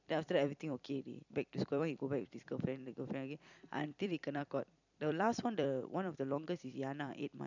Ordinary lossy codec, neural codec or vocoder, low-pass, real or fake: none; vocoder, 44.1 kHz, 128 mel bands every 256 samples, BigVGAN v2; 7.2 kHz; fake